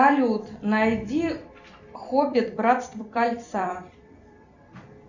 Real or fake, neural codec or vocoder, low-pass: fake; vocoder, 44.1 kHz, 128 mel bands every 256 samples, BigVGAN v2; 7.2 kHz